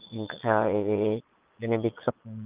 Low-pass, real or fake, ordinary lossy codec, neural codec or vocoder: 3.6 kHz; fake; Opus, 32 kbps; vocoder, 22.05 kHz, 80 mel bands, Vocos